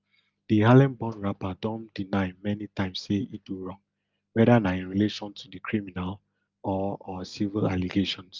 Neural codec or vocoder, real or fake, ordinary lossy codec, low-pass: none; real; Opus, 24 kbps; 7.2 kHz